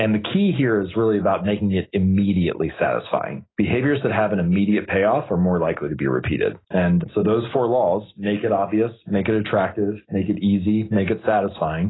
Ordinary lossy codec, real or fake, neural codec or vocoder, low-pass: AAC, 16 kbps; real; none; 7.2 kHz